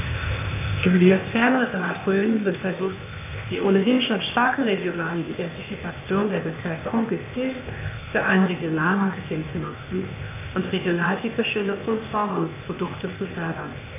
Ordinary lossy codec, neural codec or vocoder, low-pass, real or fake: AAC, 32 kbps; codec, 16 kHz, 0.8 kbps, ZipCodec; 3.6 kHz; fake